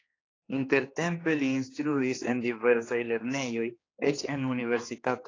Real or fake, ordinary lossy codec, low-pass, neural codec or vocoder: fake; AAC, 32 kbps; 7.2 kHz; codec, 16 kHz, 2 kbps, X-Codec, HuBERT features, trained on general audio